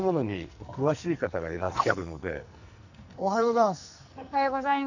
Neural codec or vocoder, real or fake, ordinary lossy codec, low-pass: codec, 44.1 kHz, 2.6 kbps, SNAC; fake; none; 7.2 kHz